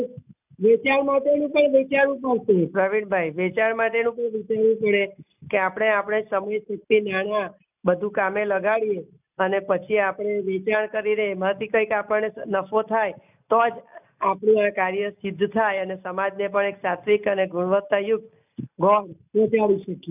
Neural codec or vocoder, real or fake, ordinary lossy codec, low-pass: none; real; none; 3.6 kHz